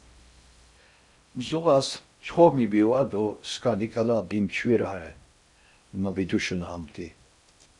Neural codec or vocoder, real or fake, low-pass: codec, 16 kHz in and 24 kHz out, 0.6 kbps, FocalCodec, streaming, 4096 codes; fake; 10.8 kHz